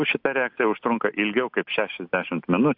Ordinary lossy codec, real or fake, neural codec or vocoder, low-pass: AAC, 48 kbps; real; none; 5.4 kHz